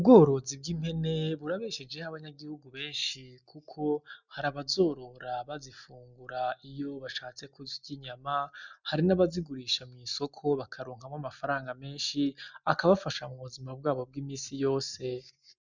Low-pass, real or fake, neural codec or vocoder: 7.2 kHz; real; none